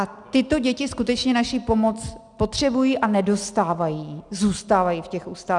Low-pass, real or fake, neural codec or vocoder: 10.8 kHz; real; none